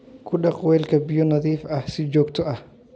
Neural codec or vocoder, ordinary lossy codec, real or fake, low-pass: none; none; real; none